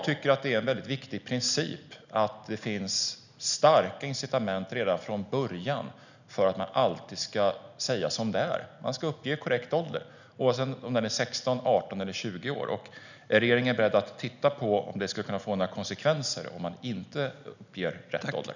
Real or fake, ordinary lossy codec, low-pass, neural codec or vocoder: real; none; 7.2 kHz; none